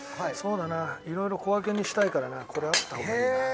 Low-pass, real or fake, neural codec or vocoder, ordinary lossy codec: none; real; none; none